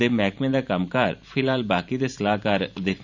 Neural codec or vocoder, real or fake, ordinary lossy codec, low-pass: vocoder, 44.1 kHz, 128 mel bands every 512 samples, BigVGAN v2; fake; Opus, 64 kbps; 7.2 kHz